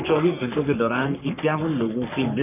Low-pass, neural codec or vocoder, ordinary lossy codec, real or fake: 3.6 kHz; codec, 44.1 kHz, 3.4 kbps, Pupu-Codec; none; fake